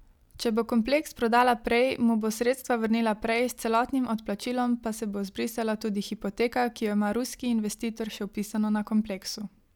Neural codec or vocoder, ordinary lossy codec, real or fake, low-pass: none; none; real; 19.8 kHz